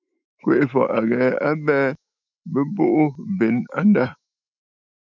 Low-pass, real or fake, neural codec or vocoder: 7.2 kHz; fake; autoencoder, 48 kHz, 128 numbers a frame, DAC-VAE, trained on Japanese speech